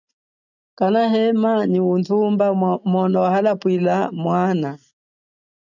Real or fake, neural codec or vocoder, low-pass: real; none; 7.2 kHz